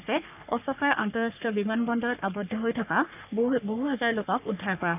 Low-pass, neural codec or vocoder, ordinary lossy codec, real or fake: 3.6 kHz; codec, 44.1 kHz, 3.4 kbps, Pupu-Codec; none; fake